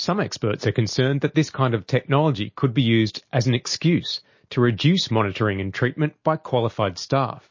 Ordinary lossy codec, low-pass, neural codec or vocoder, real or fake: MP3, 32 kbps; 7.2 kHz; none; real